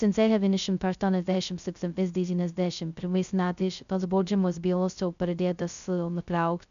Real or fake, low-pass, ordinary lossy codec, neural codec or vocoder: fake; 7.2 kHz; MP3, 96 kbps; codec, 16 kHz, 0.2 kbps, FocalCodec